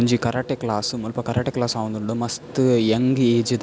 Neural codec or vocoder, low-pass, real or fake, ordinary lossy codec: none; none; real; none